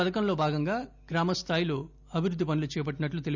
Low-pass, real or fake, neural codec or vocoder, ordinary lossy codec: 7.2 kHz; real; none; none